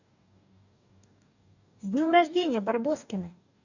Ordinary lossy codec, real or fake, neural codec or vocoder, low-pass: none; fake; codec, 44.1 kHz, 2.6 kbps, DAC; 7.2 kHz